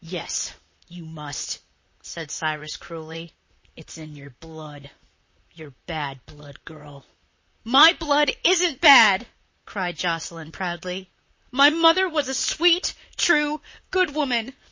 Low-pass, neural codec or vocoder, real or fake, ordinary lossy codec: 7.2 kHz; vocoder, 44.1 kHz, 128 mel bands, Pupu-Vocoder; fake; MP3, 32 kbps